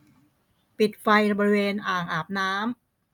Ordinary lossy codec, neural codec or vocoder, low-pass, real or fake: none; none; 19.8 kHz; real